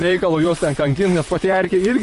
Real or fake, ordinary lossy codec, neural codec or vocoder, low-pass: fake; MP3, 48 kbps; vocoder, 44.1 kHz, 128 mel bands, Pupu-Vocoder; 14.4 kHz